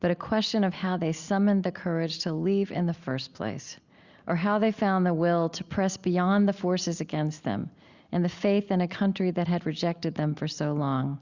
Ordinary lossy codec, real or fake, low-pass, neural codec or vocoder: Opus, 64 kbps; real; 7.2 kHz; none